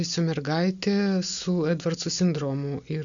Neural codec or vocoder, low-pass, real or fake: none; 7.2 kHz; real